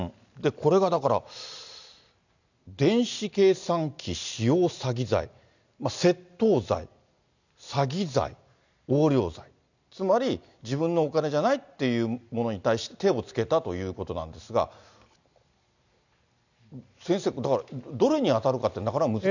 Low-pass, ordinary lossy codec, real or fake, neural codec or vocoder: 7.2 kHz; none; real; none